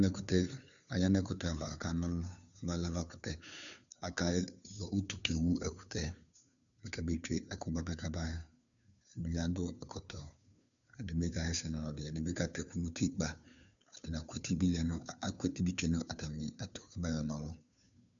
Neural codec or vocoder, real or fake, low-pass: codec, 16 kHz, 2 kbps, FunCodec, trained on Chinese and English, 25 frames a second; fake; 7.2 kHz